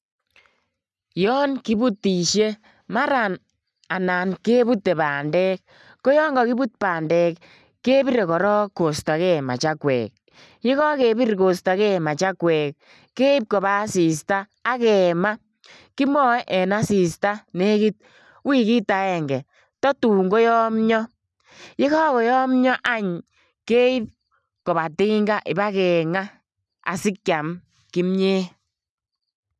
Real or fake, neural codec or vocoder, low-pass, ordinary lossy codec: real; none; none; none